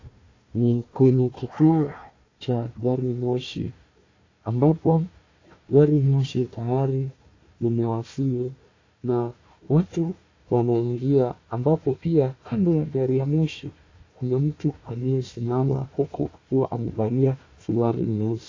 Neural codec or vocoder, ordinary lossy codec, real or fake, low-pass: codec, 16 kHz, 1 kbps, FunCodec, trained on Chinese and English, 50 frames a second; AAC, 32 kbps; fake; 7.2 kHz